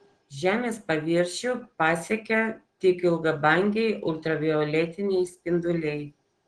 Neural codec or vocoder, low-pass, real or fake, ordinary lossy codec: none; 9.9 kHz; real; Opus, 16 kbps